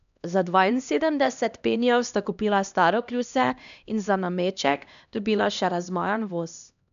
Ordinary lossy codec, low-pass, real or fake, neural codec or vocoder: none; 7.2 kHz; fake; codec, 16 kHz, 1 kbps, X-Codec, HuBERT features, trained on LibriSpeech